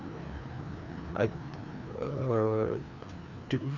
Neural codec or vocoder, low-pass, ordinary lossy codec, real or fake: codec, 16 kHz, 2 kbps, FreqCodec, larger model; 7.2 kHz; none; fake